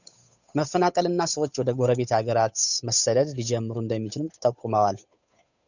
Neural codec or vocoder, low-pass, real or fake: codec, 16 kHz, 8 kbps, FunCodec, trained on Chinese and English, 25 frames a second; 7.2 kHz; fake